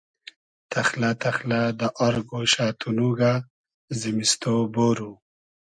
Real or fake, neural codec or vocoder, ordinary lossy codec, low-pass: real; none; MP3, 96 kbps; 9.9 kHz